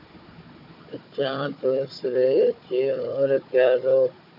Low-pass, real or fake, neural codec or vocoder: 5.4 kHz; fake; codec, 16 kHz, 4 kbps, FunCodec, trained on Chinese and English, 50 frames a second